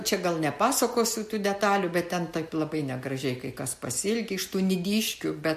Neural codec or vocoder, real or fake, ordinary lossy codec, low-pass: none; real; MP3, 64 kbps; 14.4 kHz